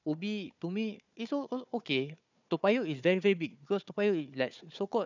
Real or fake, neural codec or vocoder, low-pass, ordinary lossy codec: fake; codec, 16 kHz, 4 kbps, FunCodec, trained on Chinese and English, 50 frames a second; 7.2 kHz; none